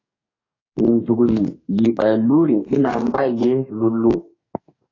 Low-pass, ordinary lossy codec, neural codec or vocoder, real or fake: 7.2 kHz; AAC, 32 kbps; codec, 44.1 kHz, 2.6 kbps, DAC; fake